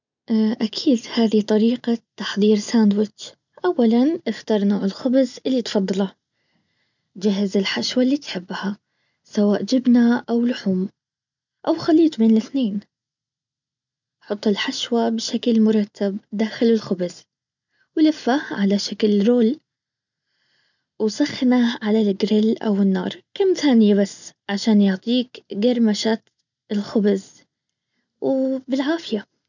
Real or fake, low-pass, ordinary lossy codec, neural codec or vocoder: real; 7.2 kHz; none; none